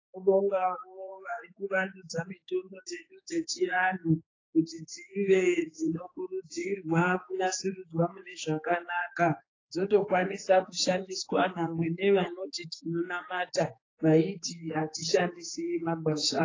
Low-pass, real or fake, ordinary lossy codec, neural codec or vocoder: 7.2 kHz; fake; AAC, 32 kbps; codec, 16 kHz, 4 kbps, X-Codec, HuBERT features, trained on general audio